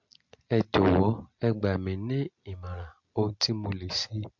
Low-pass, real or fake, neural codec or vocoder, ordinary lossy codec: 7.2 kHz; real; none; MP3, 48 kbps